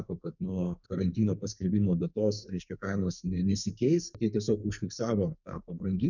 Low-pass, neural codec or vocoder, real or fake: 7.2 kHz; codec, 44.1 kHz, 2.6 kbps, SNAC; fake